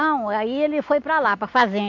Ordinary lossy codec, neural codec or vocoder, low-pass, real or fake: AAC, 48 kbps; none; 7.2 kHz; real